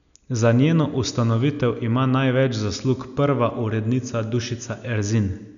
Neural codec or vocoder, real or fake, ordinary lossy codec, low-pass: none; real; none; 7.2 kHz